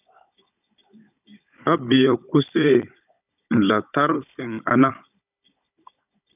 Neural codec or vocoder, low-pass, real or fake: codec, 16 kHz, 16 kbps, FunCodec, trained on Chinese and English, 50 frames a second; 3.6 kHz; fake